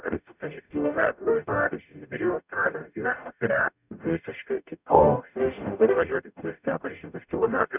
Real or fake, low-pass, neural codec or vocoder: fake; 3.6 kHz; codec, 44.1 kHz, 0.9 kbps, DAC